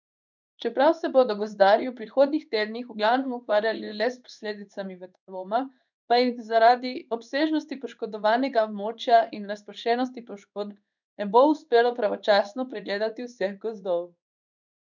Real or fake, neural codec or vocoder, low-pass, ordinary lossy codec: fake; codec, 16 kHz in and 24 kHz out, 1 kbps, XY-Tokenizer; 7.2 kHz; none